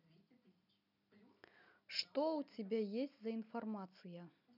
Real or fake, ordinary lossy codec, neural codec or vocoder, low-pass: real; none; none; 5.4 kHz